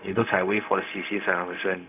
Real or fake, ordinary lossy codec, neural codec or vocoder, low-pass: fake; none; codec, 16 kHz, 0.4 kbps, LongCat-Audio-Codec; 3.6 kHz